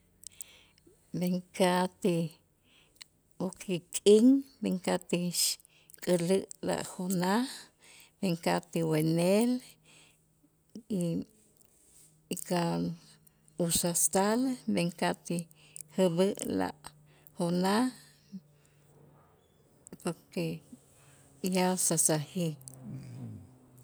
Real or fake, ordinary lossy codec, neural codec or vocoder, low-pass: real; none; none; none